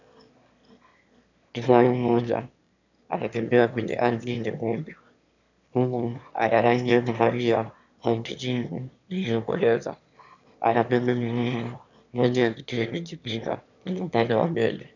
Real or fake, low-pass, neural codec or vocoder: fake; 7.2 kHz; autoencoder, 22.05 kHz, a latent of 192 numbers a frame, VITS, trained on one speaker